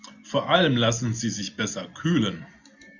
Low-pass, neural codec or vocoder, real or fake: 7.2 kHz; none; real